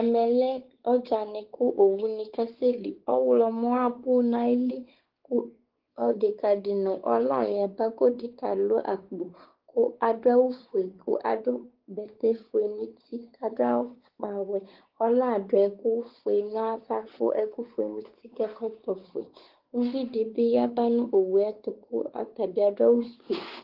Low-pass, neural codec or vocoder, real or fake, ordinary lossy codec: 5.4 kHz; codec, 16 kHz, 4 kbps, X-Codec, WavLM features, trained on Multilingual LibriSpeech; fake; Opus, 16 kbps